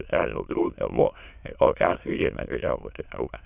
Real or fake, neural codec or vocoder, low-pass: fake; autoencoder, 22.05 kHz, a latent of 192 numbers a frame, VITS, trained on many speakers; 3.6 kHz